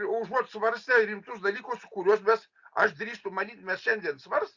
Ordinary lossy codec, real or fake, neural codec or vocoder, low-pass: Opus, 64 kbps; real; none; 7.2 kHz